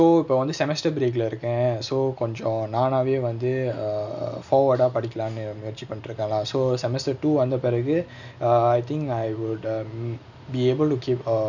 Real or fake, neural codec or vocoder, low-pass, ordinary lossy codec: real; none; 7.2 kHz; none